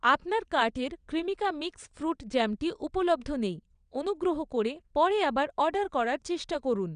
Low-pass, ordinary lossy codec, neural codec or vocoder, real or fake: 9.9 kHz; none; vocoder, 22.05 kHz, 80 mel bands, WaveNeXt; fake